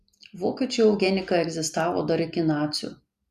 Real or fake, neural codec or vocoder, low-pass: real; none; 14.4 kHz